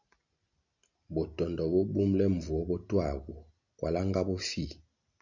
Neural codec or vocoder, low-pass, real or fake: none; 7.2 kHz; real